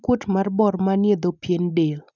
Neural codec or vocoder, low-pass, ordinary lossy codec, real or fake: none; 7.2 kHz; none; real